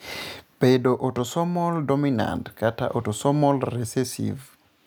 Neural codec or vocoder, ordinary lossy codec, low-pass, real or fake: none; none; none; real